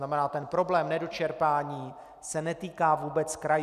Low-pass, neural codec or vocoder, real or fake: 14.4 kHz; none; real